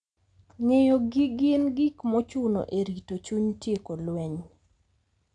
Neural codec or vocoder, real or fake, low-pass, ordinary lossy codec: none; real; 9.9 kHz; none